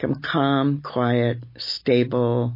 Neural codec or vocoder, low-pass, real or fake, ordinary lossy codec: none; 5.4 kHz; real; MP3, 24 kbps